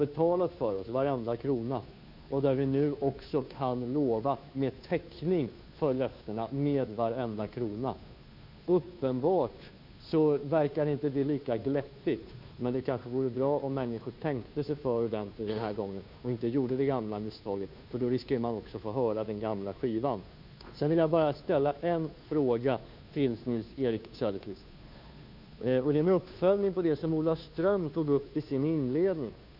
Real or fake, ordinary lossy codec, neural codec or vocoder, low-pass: fake; none; codec, 16 kHz, 2 kbps, FunCodec, trained on Chinese and English, 25 frames a second; 5.4 kHz